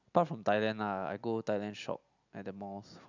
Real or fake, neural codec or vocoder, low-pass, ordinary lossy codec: real; none; 7.2 kHz; none